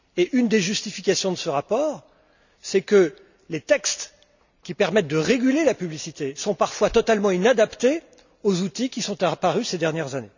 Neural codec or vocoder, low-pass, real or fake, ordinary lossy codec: none; 7.2 kHz; real; none